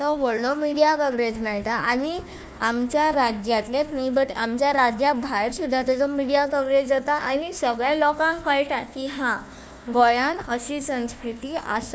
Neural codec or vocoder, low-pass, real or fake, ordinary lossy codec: codec, 16 kHz, 1 kbps, FunCodec, trained on Chinese and English, 50 frames a second; none; fake; none